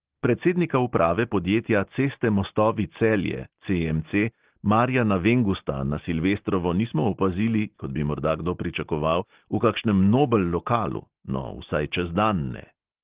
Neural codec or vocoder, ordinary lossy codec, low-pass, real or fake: none; Opus, 16 kbps; 3.6 kHz; real